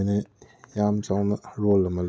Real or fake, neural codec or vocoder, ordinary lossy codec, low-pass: real; none; none; none